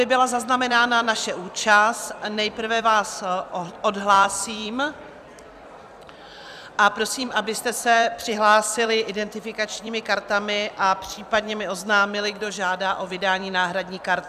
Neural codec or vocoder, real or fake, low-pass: vocoder, 44.1 kHz, 128 mel bands every 256 samples, BigVGAN v2; fake; 14.4 kHz